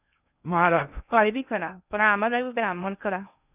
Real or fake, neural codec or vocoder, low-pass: fake; codec, 16 kHz in and 24 kHz out, 0.6 kbps, FocalCodec, streaming, 2048 codes; 3.6 kHz